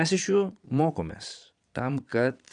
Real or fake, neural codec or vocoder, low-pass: fake; vocoder, 22.05 kHz, 80 mel bands, Vocos; 9.9 kHz